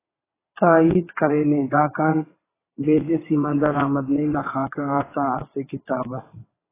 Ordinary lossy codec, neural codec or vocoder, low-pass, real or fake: AAC, 16 kbps; vocoder, 22.05 kHz, 80 mel bands, WaveNeXt; 3.6 kHz; fake